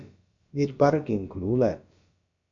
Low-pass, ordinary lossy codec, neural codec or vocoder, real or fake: 7.2 kHz; MP3, 64 kbps; codec, 16 kHz, about 1 kbps, DyCAST, with the encoder's durations; fake